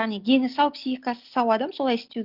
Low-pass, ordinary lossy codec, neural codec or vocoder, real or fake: 5.4 kHz; Opus, 32 kbps; none; real